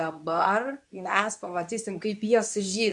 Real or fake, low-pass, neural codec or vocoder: fake; 10.8 kHz; codec, 24 kHz, 0.9 kbps, WavTokenizer, medium speech release version 2